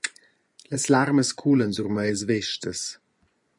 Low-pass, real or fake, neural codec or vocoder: 10.8 kHz; fake; vocoder, 24 kHz, 100 mel bands, Vocos